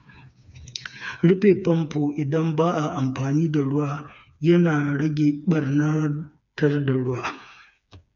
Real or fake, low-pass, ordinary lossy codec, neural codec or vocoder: fake; 7.2 kHz; none; codec, 16 kHz, 4 kbps, FreqCodec, smaller model